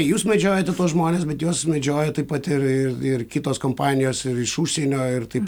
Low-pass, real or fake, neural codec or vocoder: 19.8 kHz; real; none